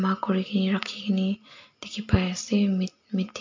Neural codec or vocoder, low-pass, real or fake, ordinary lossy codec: none; 7.2 kHz; real; MP3, 48 kbps